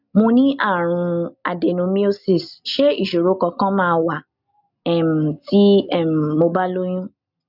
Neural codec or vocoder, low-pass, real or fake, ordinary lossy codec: none; 5.4 kHz; real; none